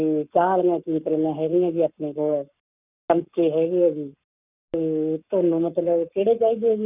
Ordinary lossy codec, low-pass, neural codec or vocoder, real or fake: none; 3.6 kHz; codec, 44.1 kHz, 7.8 kbps, Pupu-Codec; fake